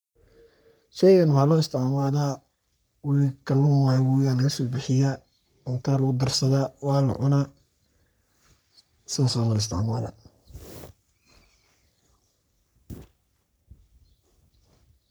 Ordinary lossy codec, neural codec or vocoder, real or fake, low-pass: none; codec, 44.1 kHz, 3.4 kbps, Pupu-Codec; fake; none